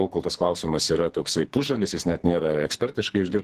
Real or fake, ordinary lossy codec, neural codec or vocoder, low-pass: fake; Opus, 16 kbps; codec, 44.1 kHz, 2.6 kbps, SNAC; 14.4 kHz